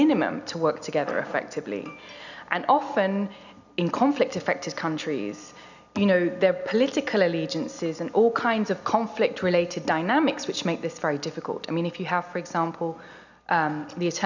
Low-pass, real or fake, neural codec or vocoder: 7.2 kHz; real; none